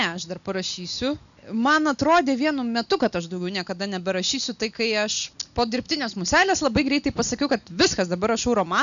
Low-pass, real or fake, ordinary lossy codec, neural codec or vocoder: 7.2 kHz; real; MP3, 96 kbps; none